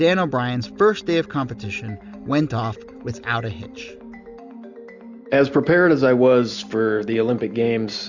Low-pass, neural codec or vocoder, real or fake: 7.2 kHz; none; real